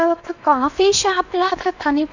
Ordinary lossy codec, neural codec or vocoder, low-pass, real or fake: none; codec, 16 kHz in and 24 kHz out, 0.8 kbps, FocalCodec, streaming, 65536 codes; 7.2 kHz; fake